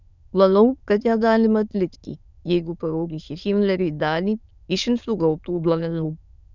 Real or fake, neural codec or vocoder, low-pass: fake; autoencoder, 22.05 kHz, a latent of 192 numbers a frame, VITS, trained on many speakers; 7.2 kHz